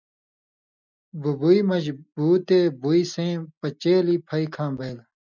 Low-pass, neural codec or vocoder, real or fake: 7.2 kHz; none; real